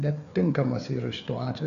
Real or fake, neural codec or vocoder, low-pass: fake; codec, 16 kHz, 4 kbps, FreqCodec, larger model; 7.2 kHz